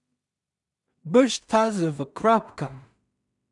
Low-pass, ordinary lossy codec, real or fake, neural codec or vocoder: 10.8 kHz; none; fake; codec, 16 kHz in and 24 kHz out, 0.4 kbps, LongCat-Audio-Codec, two codebook decoder